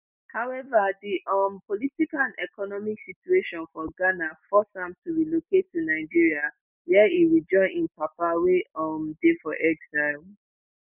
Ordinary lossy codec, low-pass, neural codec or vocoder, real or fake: none; 3.6 kHz; none; real